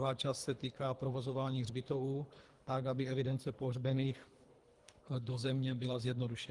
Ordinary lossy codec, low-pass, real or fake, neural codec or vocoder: Opus, 24 kbps; 10.8 kHz; fake; codec, 24 kHz, 3 kbps, HILCodec